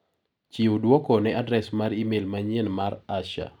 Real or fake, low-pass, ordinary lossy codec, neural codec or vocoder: real; 19.8 kHz; none; none